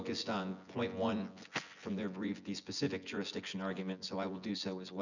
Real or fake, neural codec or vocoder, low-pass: fake; vocoder, 24 kHz, 100 mel bands, Vocos; 7.2 kHz